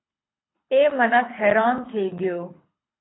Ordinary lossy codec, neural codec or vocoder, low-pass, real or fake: AAC, 16 kbps; codec, 24 kHz, 6 kbps, HILCodec; 7.2 kHz; fake